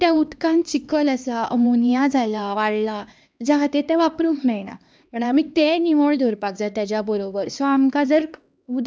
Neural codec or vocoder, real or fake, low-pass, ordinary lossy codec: codec, 16 kHz, 2 kbps, X-Codec, HuBERT features, trained on LibriSpeech; fake; none; none